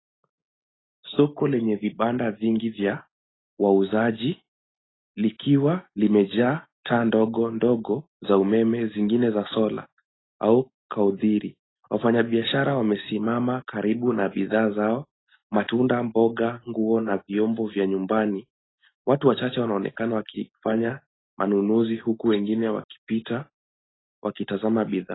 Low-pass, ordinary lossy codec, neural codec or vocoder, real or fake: 7.2 kHz; AAC, 16 kbps; none; real